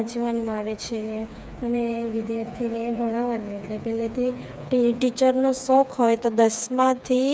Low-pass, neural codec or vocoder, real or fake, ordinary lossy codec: none; codec, 16 kHz, 4 kbps, FreqCodec, smaller model; fake; none